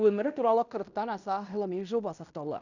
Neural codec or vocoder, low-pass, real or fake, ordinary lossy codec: codec, 16 kHz in and 24 kHz out, 0.9 kbps, LongCat-Audio-Codec, fine tuned four codebook decoder; 7.2 kHz; fake; none